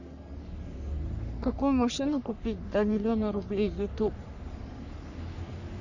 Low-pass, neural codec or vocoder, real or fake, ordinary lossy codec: 7.2 kHz; codec, 44.1 kHz, 3.4 kbps, Pupu-Codec; fake; none